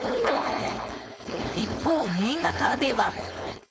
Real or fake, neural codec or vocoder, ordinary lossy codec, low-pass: fake; codec, 16 kHz, 4.8 kbps, FACodec; none; none